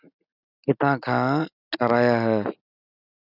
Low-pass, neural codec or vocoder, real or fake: 5.4 kHz; none; real